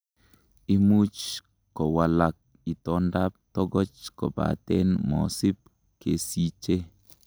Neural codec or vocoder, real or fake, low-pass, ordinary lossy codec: none; real; none; none